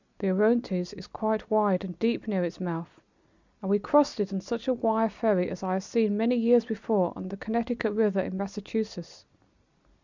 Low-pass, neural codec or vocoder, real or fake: 7.2 kHz; none; real